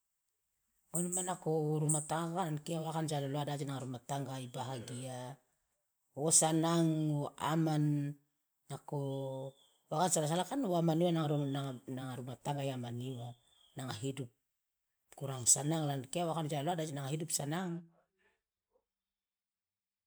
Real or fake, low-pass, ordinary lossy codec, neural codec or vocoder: fake; none; none; vocoder, 44.1 kHz, 128 mel bands every 512 samples, BigVGAN v2